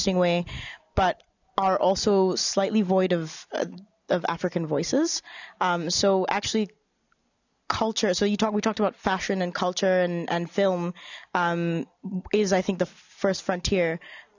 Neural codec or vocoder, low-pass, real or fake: none; 7.2 kHz; real